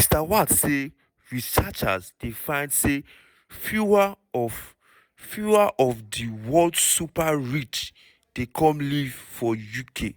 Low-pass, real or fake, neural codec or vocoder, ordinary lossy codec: none; real; none; none